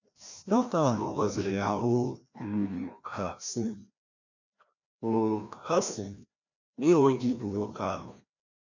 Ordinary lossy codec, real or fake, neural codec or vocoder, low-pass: none; fake; codec, 16 kHz, 1 kbps, FreqCodec, larger model; 7.2 kHz